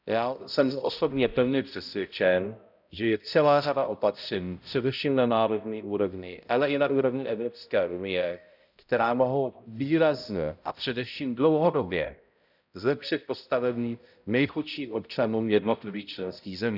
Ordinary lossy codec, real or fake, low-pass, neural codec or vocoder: none; fake; 5.4 kHz; codec, 16 kHz, 0.5 kbps, X-Codec, HuBERT features, trained on balanced general audio